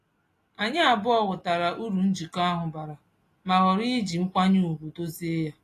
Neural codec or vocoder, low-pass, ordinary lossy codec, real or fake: none; 14.4 kHz; AAC, 48 kbps; real